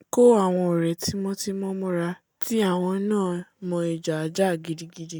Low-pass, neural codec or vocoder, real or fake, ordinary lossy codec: none; none; real; none